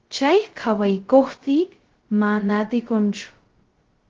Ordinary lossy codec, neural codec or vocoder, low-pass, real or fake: Opus, 16 kbps; codec, 16 kHz, 0.2 kbps, FocalCodec; 7.2 kHz; fake